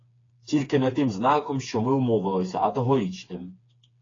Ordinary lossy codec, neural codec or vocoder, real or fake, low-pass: AAC, 32 kbps; codec, 16 kHz, 4 kbps, FreqCodec, smaller model; fake; 7.2 kHz